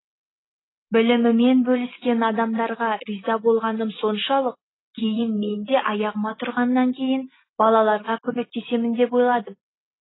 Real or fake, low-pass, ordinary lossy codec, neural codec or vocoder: real; 7.2 kHz; AAC, 16 kbps; none